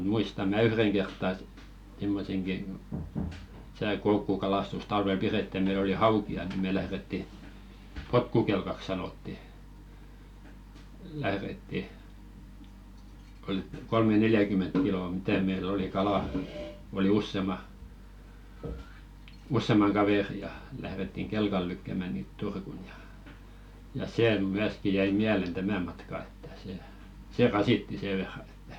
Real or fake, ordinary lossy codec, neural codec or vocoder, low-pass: real; none; none; 19.8 kHz